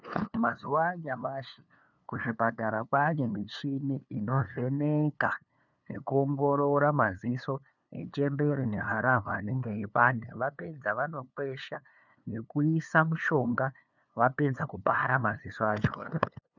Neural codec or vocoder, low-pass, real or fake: codec, 16 kHz, 2 kbps, FunCodec, trained on LibriTTS, 25 frames a second; 7.2 kHz; fake